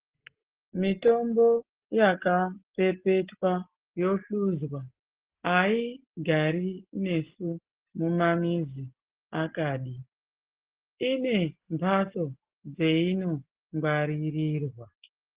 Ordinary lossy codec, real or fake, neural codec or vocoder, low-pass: Opus, 16 kbps; real; none; 3.6 kHz